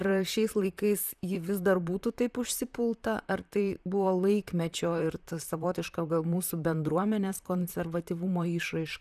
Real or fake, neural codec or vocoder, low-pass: fake; vocoder, 44.1 kHz, 128 mel bands, Pupu-Vocoder; 14.4 kHz